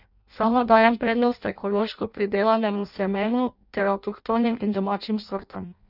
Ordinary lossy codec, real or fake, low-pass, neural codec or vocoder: none; fake; 5.4 kHz; codec, 16 kHz in and 24 kHz out, 0.6 kbps, FireRedTTS-2 codec